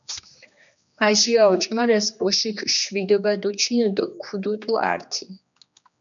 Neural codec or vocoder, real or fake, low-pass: codec, 16 kHz, 2 kbps, X-Codec, HuBERT features, trained on general audio; fake; 7.2 kHz